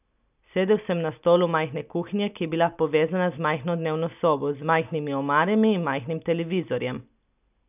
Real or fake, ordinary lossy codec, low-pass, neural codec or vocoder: real; none; 3.6 kHz; none